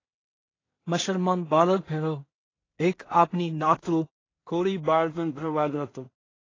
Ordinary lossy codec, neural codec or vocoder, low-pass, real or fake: AAC, 32 kbps; codec, 16 kHz in and 24 kHz out, 0.4 kbps, LongCat-Audio-Codec, two codebook decoder; 7.2 kHz; fake